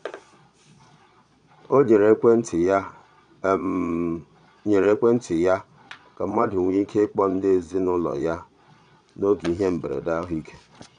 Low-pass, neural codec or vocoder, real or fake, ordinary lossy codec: 9.9 kHz; vocoder, 22.05 kHz, 80 mel bands, Vocos; fake; none